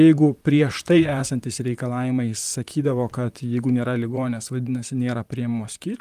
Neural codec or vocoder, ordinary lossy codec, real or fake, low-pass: vocoder, 44.1 kHz, 128 mel bands, Pupu-Vocoder; Opus, 32 kbps; fake; 14.4 kHz